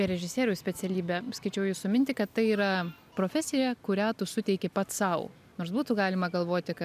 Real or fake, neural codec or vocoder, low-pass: real; none; 14.4 kHz